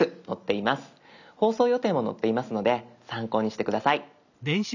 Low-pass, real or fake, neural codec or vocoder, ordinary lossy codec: 7.2 kHz; real; none; none